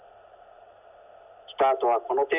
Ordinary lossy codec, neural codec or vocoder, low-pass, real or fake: none; none; 3.6 kHz; real